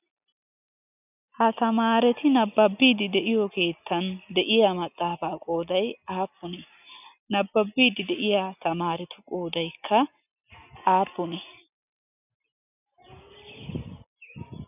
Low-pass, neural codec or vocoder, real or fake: 3.6 kHz; none; real